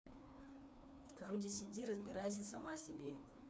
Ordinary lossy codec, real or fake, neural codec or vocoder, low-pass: none; fake; codec, 16 kHz, 2 kbps, FreqCodec, larger model; none